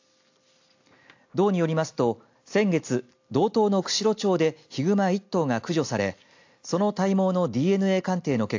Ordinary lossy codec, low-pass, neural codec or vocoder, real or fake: AAC, 48 kbps; 7.2 kHz; none; real